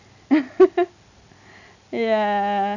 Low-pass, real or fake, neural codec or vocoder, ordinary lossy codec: 7.2 kHz; real; none; none